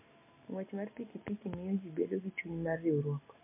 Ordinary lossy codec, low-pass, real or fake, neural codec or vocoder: MP3, 24 kbps; 3.6 kHz; real; none